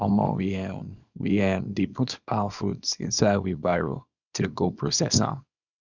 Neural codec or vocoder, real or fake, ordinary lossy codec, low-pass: codec, 24 kHz, 0.9 kbps, WavTokenizer, small release; fake; none; 7.2 kHz